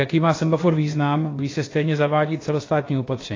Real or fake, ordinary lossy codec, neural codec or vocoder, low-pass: fake; AAC, 32 kbps; codec, 16 kHz, about 1 kbps, DyCAST, with the encoder's durations; 7.2 kHz